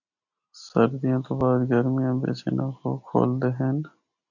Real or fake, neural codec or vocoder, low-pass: real; none; 7.2 kHz